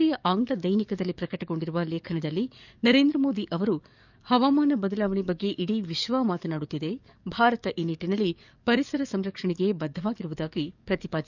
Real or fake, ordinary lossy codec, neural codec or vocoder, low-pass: fake; none; codec, 44.1 kHz, 7.8 kbps, DAC; 7.2 kHz